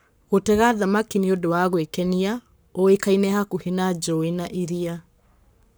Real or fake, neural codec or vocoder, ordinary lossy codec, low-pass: fake; codec, 44.1 kHz, 7.8 kbps, Pupu-Codec; none; none